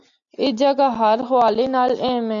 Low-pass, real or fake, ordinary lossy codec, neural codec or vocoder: 7.2 kHz; real; MP3, 64 kbps; none